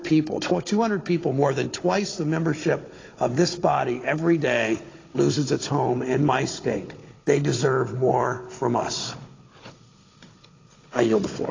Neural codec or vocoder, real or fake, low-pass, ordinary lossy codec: codec, 16 kHz, 16 kbps, FunCodec, trained on Chinese and English, 50 frames a second; fake; 7.2 kHz; AAC, 32 kbps